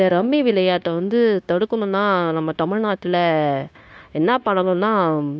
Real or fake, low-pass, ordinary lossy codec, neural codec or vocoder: fake; none; none; codec, 16 kHz, 0.9 kbps, LongCat-Audio-Codec